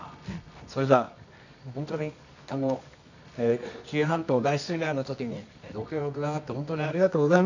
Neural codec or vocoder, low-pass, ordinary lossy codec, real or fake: codec, 24 kHz, 0.9 kbps, WavTokenizer, medium music audio release; 7.2 kHz; none; fake